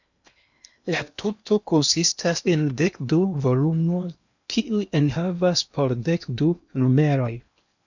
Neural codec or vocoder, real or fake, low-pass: codec, 16 kHz in and 24 kHz out, 0.8 kbps, FocalCodec, streaming, 65536 codes; fake; 7.2 kHz